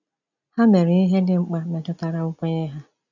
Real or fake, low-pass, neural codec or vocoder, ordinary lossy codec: real; 7.2 kHz; none; none